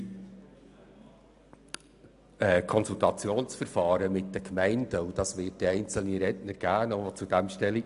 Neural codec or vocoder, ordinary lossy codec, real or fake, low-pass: none; AAC, 96 kbps; real; 10.8 kHz